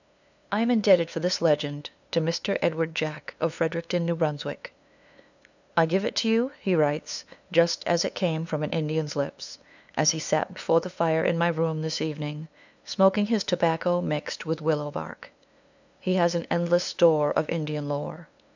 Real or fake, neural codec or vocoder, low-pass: fake; codec, 16 kHz, 2 kbps, FunCodec, trained on LibriTTS, 25 frames a second; 7.2 kHz